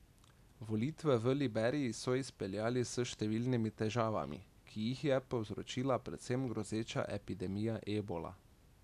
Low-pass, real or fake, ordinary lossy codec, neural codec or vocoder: 14.4 kHz; real; none; none